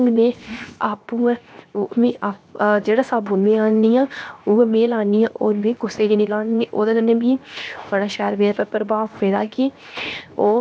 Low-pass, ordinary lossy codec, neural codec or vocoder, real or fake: none; none; codec, 16 kHz, 0.7 kbps, FocalCodec; fake